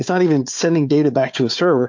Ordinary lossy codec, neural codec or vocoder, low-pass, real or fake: MP3, 48 kbps; codec, 16 kHz, 4 kbps, FunCodec, trained on Chinese and English, 50 frames a second; 7.2 kHz; fake